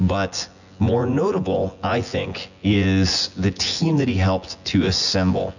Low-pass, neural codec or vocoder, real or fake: 7.2 kHz; vocoder, 24 kHz, 100 mel bands, Vocos; fake